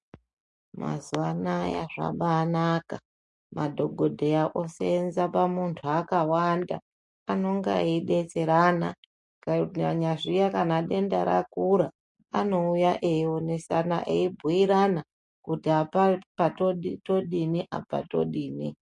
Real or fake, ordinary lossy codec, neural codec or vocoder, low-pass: real; MP3, 48 kbps; none; 10.8 kHz